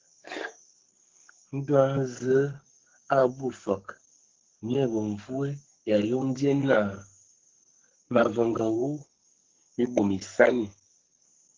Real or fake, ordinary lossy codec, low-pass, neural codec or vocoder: fake; Opus, 16 kbps; 7.2 kHz; codec, 44.1 kHz, 2.6 kbps, SNAC